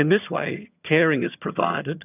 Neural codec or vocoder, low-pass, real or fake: vocoder, 22.05 kHz, 80 mel bands, HiFi-GAN; 3.6 kHz; fake